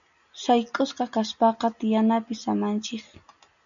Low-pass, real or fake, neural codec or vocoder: 7.2 kHz; real; none